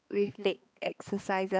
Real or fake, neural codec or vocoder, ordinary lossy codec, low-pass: fake; codec, 16 kHz, 2 kbps, X-Codec, HuBERT features, trained on balanced general audio; none; none